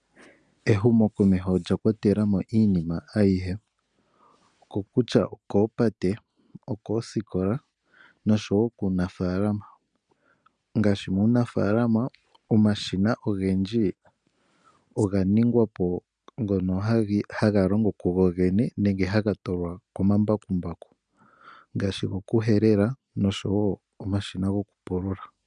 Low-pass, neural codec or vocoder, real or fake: 9.9 kHz; none; real